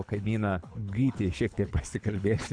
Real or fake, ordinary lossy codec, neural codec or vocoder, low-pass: fake; AAC, 64 kbps; codec, 24 kHz, 6 kbps, HILCodec; 9.9 kHz